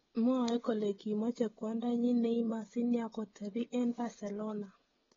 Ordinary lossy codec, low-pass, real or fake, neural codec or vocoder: AAC, 24 kbps; 7.2 kHz; fake; codec, 16 kHz, 16 kbps, FreqCodec, smaller model